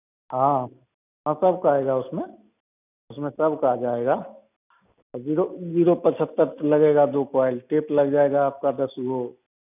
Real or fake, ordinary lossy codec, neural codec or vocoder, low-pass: real; none; none; 3.6 kHz